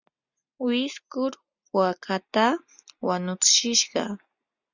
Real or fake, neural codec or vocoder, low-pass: real; none; 7.2 kHz